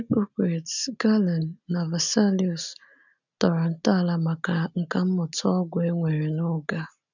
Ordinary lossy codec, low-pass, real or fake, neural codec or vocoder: none; 7.2 kHz; real; none